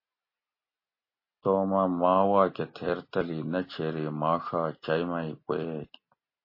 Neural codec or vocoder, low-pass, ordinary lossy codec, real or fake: none; 5.4 kHz; MP3, 24 kbps; real